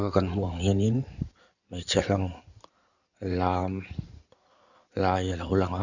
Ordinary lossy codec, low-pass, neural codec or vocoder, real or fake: none; 7.2 kHz; codec, 16 kHz in and 24 kHz out, 2.2 kbps, FireRedTTS-2 codec; fake